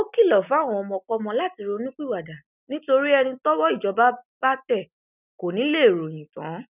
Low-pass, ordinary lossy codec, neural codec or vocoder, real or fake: 3.6 kHz; none; none; real